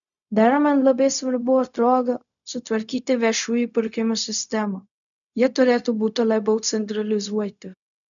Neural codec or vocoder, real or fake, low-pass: codec, 16 kHz, 0.4 kbps, LongCat-Audio-Codec; fake; 7.2 kHz